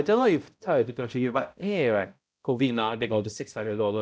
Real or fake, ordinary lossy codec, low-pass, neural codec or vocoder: fake; none; none; codec, 16 kHz, 0.5 kbps, X-Codec, HuBERT features, trained on balanced general audio